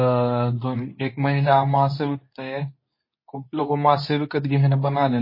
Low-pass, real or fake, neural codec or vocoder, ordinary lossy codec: 5.4 kHz; fake; codec, 24 kHz, 0.9 kbps, WavTokenizer, medium speech release version 2; MP3, 24 kbps